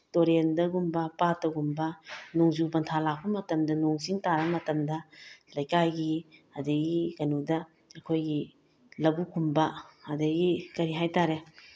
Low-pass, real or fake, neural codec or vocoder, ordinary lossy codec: none; real; none; none